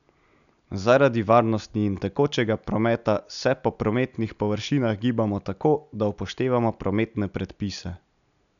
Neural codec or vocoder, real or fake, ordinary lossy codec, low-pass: none; real; none; 7.2 kHz